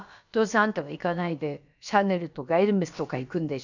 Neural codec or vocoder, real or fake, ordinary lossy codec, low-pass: codec, 16 kHz, about 1 kbps, DyCAST, with the encoder's durations; fake; none; 7.2 kHz